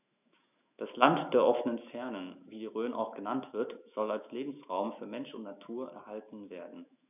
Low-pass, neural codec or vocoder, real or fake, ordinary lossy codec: 3.6 kHz; autoencoder, 48 kHz, 128 numbers a frame, DAC-VAE, trained on Japanese speech; fake; none